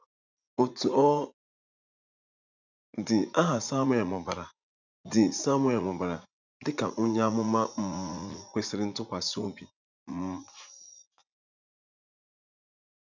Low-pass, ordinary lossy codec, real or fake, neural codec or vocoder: 7.2 kHz; none; fake; vocoder, 44.1 kHz, 80 mel bands, Vocos